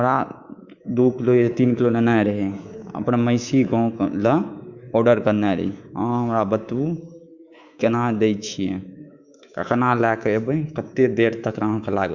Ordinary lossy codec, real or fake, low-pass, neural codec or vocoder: Opus, 64 kbps; fake; 7.2 kHz; codec, 24 kHz, 3.1 kbps, DualCodec